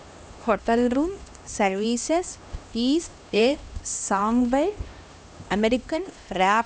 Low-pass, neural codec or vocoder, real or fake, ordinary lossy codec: none; codec, 16 kHz, 1 kbps, X-Codec, HuBERT features, trained on LibriSpeech; fake; none